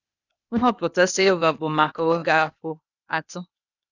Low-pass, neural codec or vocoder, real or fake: 7.2 kHz; codec, 16 kHz, 0.8 kbps, ZipCodec; fake